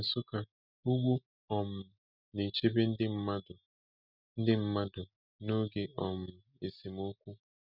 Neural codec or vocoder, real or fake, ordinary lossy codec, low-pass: none; real; none; 5.4 kHz